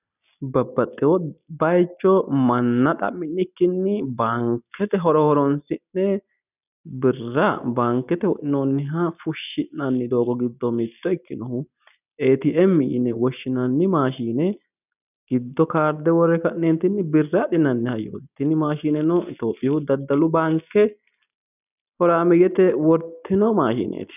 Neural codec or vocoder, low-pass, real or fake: none; 3.6 kHz; real